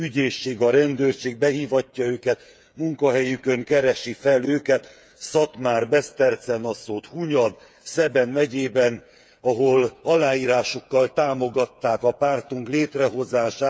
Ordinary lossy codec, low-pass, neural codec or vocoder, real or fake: none; none; codec, 16 kHz, 8 kbps, FreqCodec, smaller model; fake